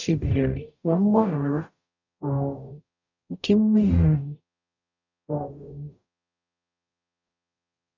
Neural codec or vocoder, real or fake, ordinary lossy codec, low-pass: codec, 44.1 kHz, 0.9 kbps, DAC; fake; none; 7.2 kHz